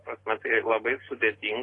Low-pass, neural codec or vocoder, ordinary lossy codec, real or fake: 10.8 kHz; none; AAC, 32 kbps; real